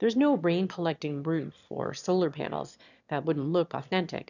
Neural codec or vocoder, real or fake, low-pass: autoencoder, 22.05 kHz, a latent of 192 numbers a frame, VITS, trained on one speaker; fake; 7.2 kHz